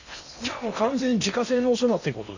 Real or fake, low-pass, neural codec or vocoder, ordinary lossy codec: fake; 7.2 kHz; codec, 16 kHz in and 24 kHz out, 0.8 kbps, FocalCodec, streaming, 65536 codes; none